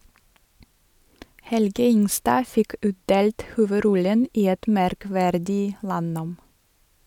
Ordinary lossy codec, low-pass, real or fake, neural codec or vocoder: none; 19.8 kHz; real; none